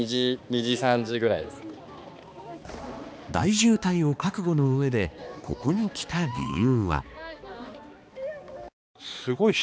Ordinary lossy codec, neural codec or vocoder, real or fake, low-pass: none; codec, 16 kHz, 2 kbps, X-Codec, HuBERT features, trained on balanced general audio; fake; none